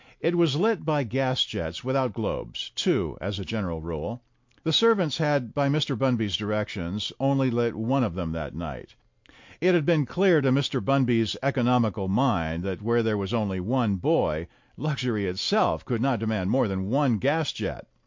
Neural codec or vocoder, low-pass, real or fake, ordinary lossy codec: none; 7.2 kHz; real; MP3, 48 kbps